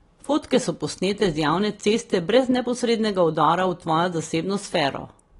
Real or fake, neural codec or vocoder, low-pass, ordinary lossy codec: real; none; 10.8 kHz; AAC, 32 kbps